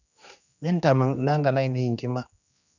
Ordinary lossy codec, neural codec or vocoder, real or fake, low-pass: none; codec, 16 kHz, 2 kbps, X-Codec, HuBERT features, trained on general audio; fake; 7.2 kHz